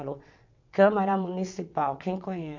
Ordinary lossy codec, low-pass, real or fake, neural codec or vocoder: MP3, 64 kbps; 7.2 kHz; fake; vocoder, 22.05 kHz, 80 mel bands, WaveNeXt